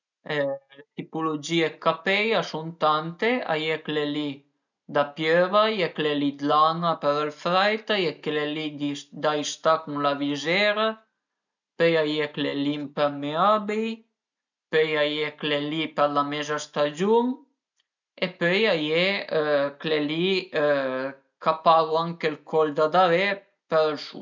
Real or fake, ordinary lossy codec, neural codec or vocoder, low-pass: real; none; none; 7.2 kHz